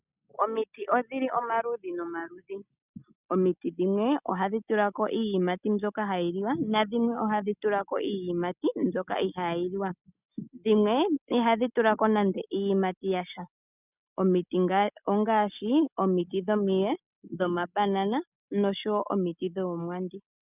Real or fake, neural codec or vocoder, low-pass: real; none; 3.6 kHz